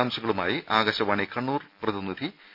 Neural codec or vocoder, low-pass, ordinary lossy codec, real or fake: none; 5.4 kHz; none; real